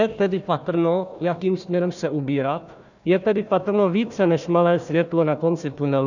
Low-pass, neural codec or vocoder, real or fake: 7.2 kHz; codec, 16 kHz, 1 kbps, FunCodec, trained on Chinese and English, 50 frames a second; fake